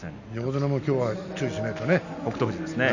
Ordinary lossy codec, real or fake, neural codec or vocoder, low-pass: none; real; none; 7.2 kHz